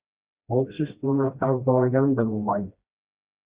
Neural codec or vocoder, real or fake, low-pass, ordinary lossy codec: codec, 16 kHz, 1 kbps, FreqCodec, smaller model; fake; 3.6 kHz; Opus, 64 kbps